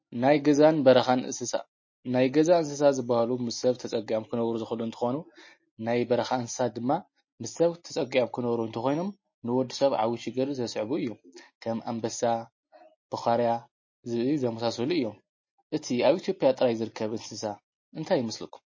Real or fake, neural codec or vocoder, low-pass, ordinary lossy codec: real; none; 7.2 kHz; MP3, 32 kbps